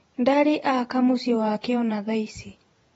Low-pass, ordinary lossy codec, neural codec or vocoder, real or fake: 19.8 kHz; AAC, 24 kbps; vocoder, 48 kHz, 128 mel bands, Vocos; fake